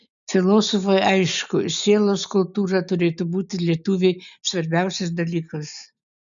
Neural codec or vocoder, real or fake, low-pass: none; real; 7.2 kHz